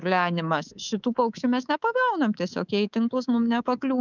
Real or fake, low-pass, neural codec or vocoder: fake; 7.2 kHz; codec, 24 kHz, 3.1 kbps, DualCodec